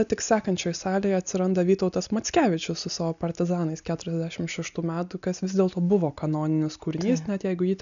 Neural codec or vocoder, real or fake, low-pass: none; real; 7.2 kHz